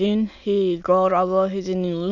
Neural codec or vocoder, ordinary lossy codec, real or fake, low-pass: autoencoder, 22.05 kHz, a latent of 192 numbers a frame, VITS, trained on many speakers; none; fake; 7.2 kHz